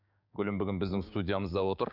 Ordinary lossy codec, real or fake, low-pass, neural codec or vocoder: none; fake; 5.4 kHz; codec, 16 kHz, 6 kbps, DAC